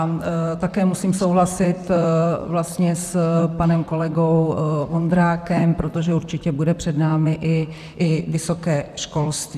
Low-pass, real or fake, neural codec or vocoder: 14.4 kHz; fake; vocoder, 44.1 kHz, 128 mel bands, Pupu-Vocoder